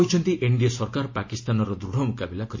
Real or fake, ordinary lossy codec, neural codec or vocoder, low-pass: real; MP3, 32 kbps; none; 7.2 kHz